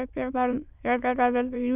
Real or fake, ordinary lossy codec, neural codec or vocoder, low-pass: fake; none; autoencoder, 22.05 kHz, a latent of 192 numbers a frame, VITS, trained on many speakers; 3.6 kHz